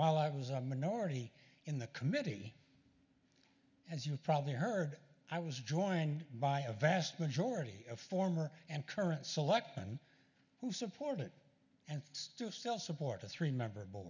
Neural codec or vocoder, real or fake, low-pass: none; real; 7.2 kHz